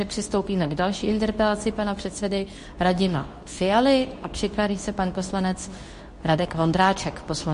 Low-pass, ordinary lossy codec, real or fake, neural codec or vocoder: 10.8 kHz; MP3, 48 kbps; fake; codec, 24 kHz, 0.9 kbps, WavTokenizer, medium speech release version 2